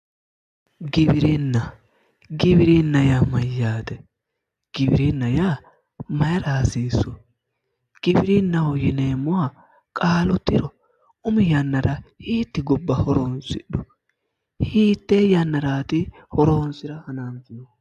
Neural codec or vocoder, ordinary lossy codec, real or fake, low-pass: none; AAC, 96 kbps; real; 14.4 kHz